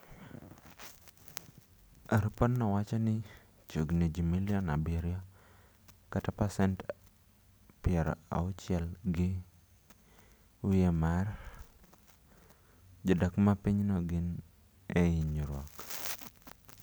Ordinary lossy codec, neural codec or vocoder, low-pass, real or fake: none; none; none; real